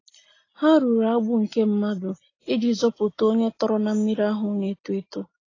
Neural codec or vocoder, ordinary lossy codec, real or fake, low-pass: none; AAC, 32 kbps; real; 7.2 kHz